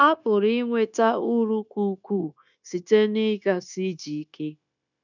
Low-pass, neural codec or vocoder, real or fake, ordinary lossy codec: 7.2 kHz; codec, 16 kHz, 0.9 kbps, LongCat-Audio-Codec; fake; none